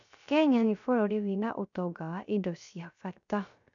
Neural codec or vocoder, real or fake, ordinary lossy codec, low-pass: codec, 16 kHz, 0.3 kbps, FocalCodec; fake; none; 7.2 kHz